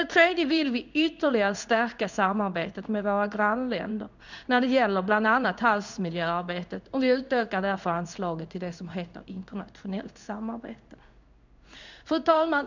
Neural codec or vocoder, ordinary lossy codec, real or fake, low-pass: codec, 16 kHz in and 24 kHz out, 1 kbps, XY-Tokenizer; none; fake; 7.2 kHz